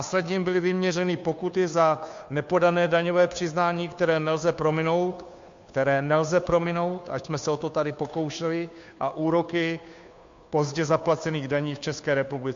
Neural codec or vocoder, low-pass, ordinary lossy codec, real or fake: codec, 16 kHz, 2 kbps, FunCodec, trained on Chinese and English, 25 frames a second; 7.2 kHz; MP3, 48 kbps; fake